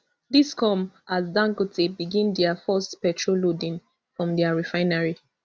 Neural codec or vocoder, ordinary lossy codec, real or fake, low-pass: none; none; real; none